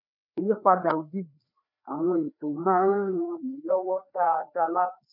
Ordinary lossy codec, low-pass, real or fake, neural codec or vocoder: none; 5.4 kHz; fake; codec, 16 kHz, 2 kbps, FreqCodec, larger model